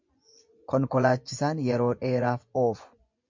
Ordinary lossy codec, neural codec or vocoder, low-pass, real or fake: MP3, 64 kbps; vocoder, 24 kHz, 100 mel bands, Vocos; 7.2 kHz; fake